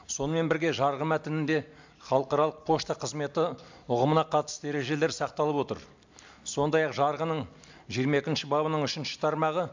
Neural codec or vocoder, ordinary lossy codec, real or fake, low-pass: none; MP3, 64 kbps; real; 7.2 kHz